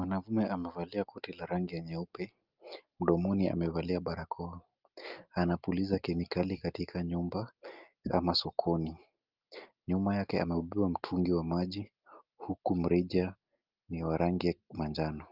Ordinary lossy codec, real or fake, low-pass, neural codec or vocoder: Opus, 24 kbps; real; 5.4 kHz; none